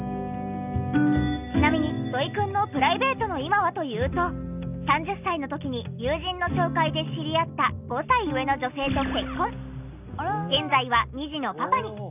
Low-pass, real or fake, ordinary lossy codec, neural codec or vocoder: 3.6 kHz; real; none; none